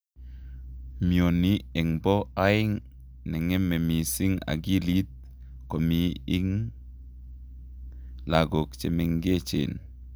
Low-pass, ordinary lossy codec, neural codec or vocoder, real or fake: none; none; none; real